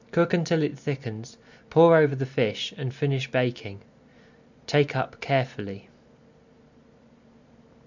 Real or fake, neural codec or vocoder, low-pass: real; none; 7.2 kHz